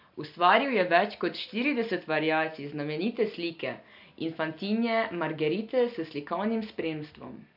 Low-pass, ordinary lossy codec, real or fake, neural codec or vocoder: 5.4 kHz; MP3, 48 kbps; real; none